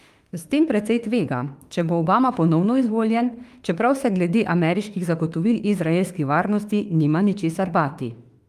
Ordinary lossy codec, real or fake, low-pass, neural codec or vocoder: Opus, 32 kbps; fake; 14.4 kHz; autoencoder, 48 kHz, 32 numbers a frame, DAC-VAE, trained on Japanese speech